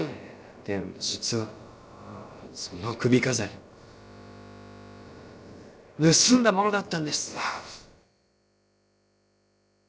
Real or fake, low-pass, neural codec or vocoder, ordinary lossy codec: fake; none; codec, 16 kHz, about 1 kbps, DyCAST, with the encoder's durations; none